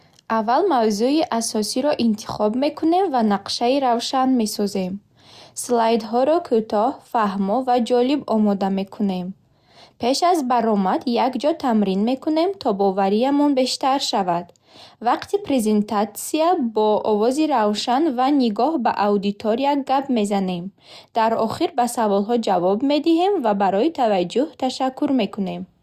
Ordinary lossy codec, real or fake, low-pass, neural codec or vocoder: Opus, 64 kbps; real; 14.4 kHz; none